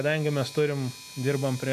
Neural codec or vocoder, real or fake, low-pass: none; real; 14.4 kHz